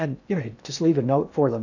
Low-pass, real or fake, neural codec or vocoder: 7.2 kHz; fake; codec, 16 kHz in and 24 kHz out, 0.6 kbps, FocalCodec, streaming, 2048 codes